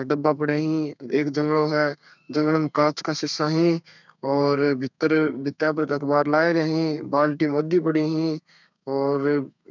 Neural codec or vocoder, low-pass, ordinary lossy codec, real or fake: codec, 32 kHz, 1.9 kbps, SNAC; 7.2 kHz; none; fake